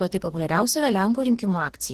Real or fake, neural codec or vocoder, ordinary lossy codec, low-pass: fake; codec, 44.1 kHz, 2.6 kbps, DAC; Opus, 16 kbps; 14.4 kHz